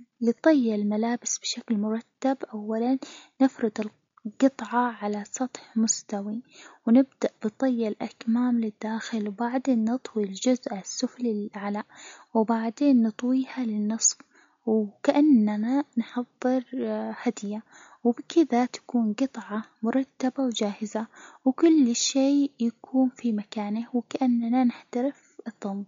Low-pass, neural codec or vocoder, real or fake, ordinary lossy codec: 7.2 kHz; none; real; MP3, 48 kbps